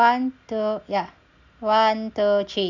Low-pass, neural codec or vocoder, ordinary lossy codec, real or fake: 7.2 kHz; none; none; real